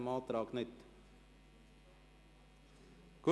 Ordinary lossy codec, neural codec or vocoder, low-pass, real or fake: none; none; none; real